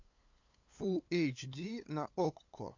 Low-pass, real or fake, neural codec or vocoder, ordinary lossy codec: 7.2 kHz; fake; codec, 16 kHz, 8 kbps, FunCodec, trained on LibriTTS, 25 frames a second; AAC, 48 kbps